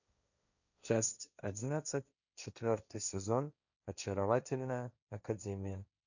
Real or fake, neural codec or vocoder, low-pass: fake; codec, 16 kHz, 1.1 kbps, Voila-Tokenizer; 7.2 kHz